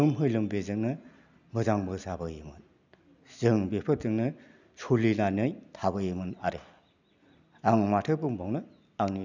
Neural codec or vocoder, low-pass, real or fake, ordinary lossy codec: none; 7.2 kHz; real; none